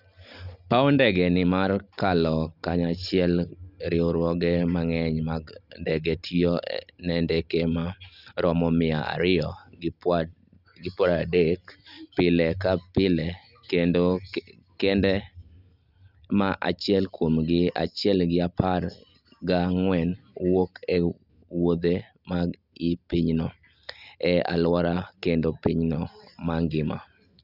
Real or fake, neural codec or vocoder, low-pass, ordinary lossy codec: real; none; 5.4 kHz; Opus, 64 kbps